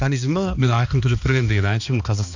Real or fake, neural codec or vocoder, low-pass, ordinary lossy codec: fake; codec, 16 kHz, 2 kbps, X-Codec, HuBERT features, trained on balanced general audio; 7.2 kHz; none